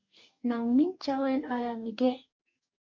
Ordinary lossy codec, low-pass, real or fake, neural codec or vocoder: MP3, 48 kbps; 7.2 kHz; fake; codec, 44.1 kHz, 2.6 kbps, DAC